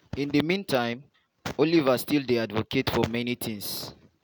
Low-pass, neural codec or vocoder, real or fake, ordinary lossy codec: none; none; real; none